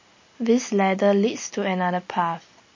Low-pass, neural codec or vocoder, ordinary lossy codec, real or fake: 7.2 kHz; none; MP3, 32 kbps; real